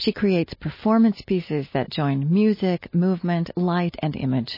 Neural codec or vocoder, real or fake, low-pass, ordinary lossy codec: none; real; 5.4 kHz; MP3, 24 kbps